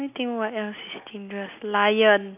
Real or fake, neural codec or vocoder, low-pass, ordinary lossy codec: real; none; 3.6 kHz; none